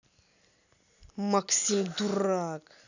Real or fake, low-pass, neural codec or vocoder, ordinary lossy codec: real; 7.2 kHz; none; none